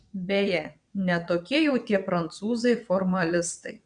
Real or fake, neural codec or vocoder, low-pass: fake; vocoder, 22.05 kHz, 80 mel bands, WaveNeXt; 9.9 kHz